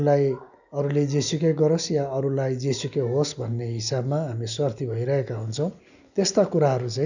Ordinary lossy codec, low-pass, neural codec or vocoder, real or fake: none; 7.2 kHz; none; real